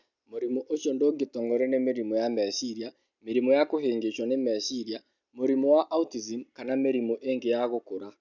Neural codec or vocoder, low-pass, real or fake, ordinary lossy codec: none; 7.2 kHz; real; none